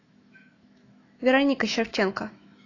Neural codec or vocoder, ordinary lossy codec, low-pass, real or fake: autoencoder, 48 kHz, 128 numbers a frame, DAC-VAE, trained on Japanese speech; AAC, 32 kbps; 7.2 kHz; fake